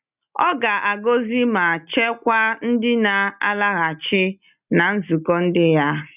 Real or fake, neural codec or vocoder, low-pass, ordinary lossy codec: real; none; 3.6 kHz; none